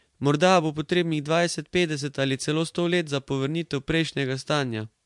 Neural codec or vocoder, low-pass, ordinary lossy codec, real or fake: vocoder, 44.1 kHz, 128 mel bands every 512 samples, BigVGAN v2; 10.8 kHz; MP3, 64 kbps; fake